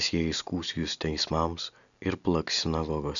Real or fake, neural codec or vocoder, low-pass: real; none; 7.2 kHz